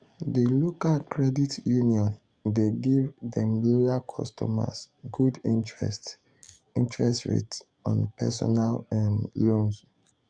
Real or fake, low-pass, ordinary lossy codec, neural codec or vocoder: fake; 9.9 kHz; Opus, 64 kbps; codec, 44.1 kHz, 7.8 kbps, DAC